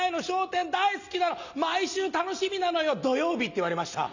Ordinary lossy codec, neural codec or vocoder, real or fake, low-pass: none; none; real; 7.2 kHz